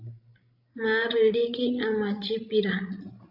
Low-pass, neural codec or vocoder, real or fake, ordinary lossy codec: 5.4 kHz; codec, 16 kHz, 16 kbps, FreqCodec, larger model; fake; AAC, 48 kbps